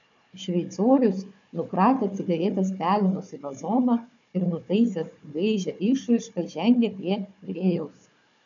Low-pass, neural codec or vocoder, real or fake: 7.2 kHz; codec, 16 kHz, 4 kbps, FunCodec, trained on Chinese and English, 50 frames a second; fake